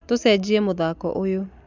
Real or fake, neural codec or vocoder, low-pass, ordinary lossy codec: real; none; 7.2 kHz; none